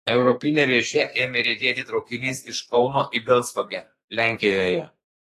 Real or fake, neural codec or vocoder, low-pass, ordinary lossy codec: fake; codec, 32 kHz, 1.9 kbps, SNAC; 14.4 kHz; AAC, 48 kbps